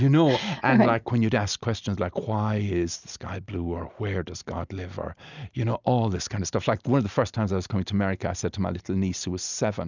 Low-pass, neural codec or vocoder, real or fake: 7.2 kHz; none; real